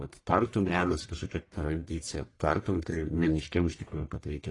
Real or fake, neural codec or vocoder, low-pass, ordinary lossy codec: fake; codec, 44.1 kHz, 1.7 kbps, Pupu-Codec; 10.8 kHz; AAC, 32 kbps